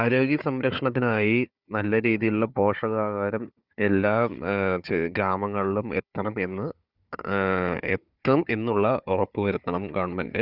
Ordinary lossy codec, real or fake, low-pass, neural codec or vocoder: Opus, 64 kbps; fake; 5.4 kHz; codec, 16 kHz, 4 kbps, FunCodec, trained on Chinese and English, 50 frames a second